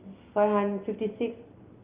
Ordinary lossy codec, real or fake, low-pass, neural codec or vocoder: Opus, 24 kbps; real; 3.6 kHz; none